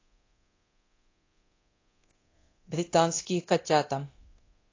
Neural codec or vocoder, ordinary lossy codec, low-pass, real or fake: codec, 24 kHz, 0.9 kbps, DualCodec; AAC, 32 kbps; 7.2 kHz; fake